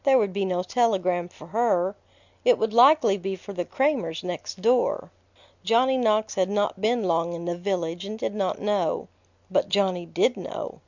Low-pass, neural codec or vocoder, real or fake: 7.2 kHz; none; real